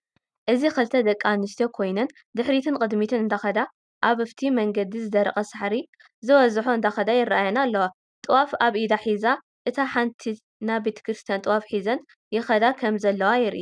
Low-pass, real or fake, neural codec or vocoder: 9.9 kHz; real; none